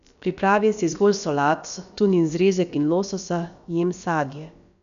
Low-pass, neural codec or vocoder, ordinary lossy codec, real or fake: 7.2 kHz; codec, 16 kHz, about 1 kbps, DyCAST, with the encoder's durations; none; fake